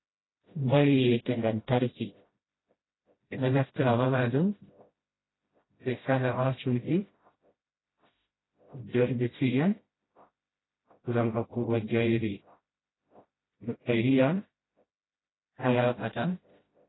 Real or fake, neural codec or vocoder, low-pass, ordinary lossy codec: fake; codec, 16 kHz, 0.5 kbps, FreqCodec, smaller model; 7.2 kHz; AAC, 16 kbps